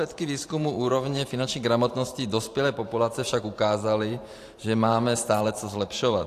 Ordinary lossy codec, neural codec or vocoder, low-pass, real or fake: AAC, 64 kbps; vocoder, 44.1 kHz, 128 mel bands every 512 samples, BigVGAN v2; 14.4 kHz; fake